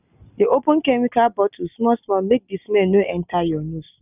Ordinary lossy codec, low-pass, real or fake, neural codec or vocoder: none; 3.6 kHz; real; none